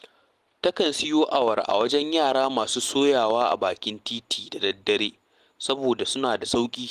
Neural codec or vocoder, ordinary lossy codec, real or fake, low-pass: none; Opus, 24 kbps; real; 14.4 kHz